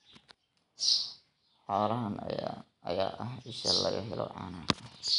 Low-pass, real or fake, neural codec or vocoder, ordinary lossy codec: 10.8 kHz; real; none; none